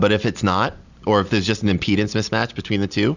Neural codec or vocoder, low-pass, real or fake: none; 7.2 kHz; real